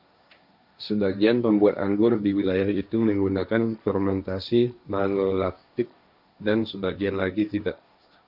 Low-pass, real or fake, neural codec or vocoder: 5.4 kHz; fake; codec, 16 kHz, 1.1 kbps, Voila-Tokenizer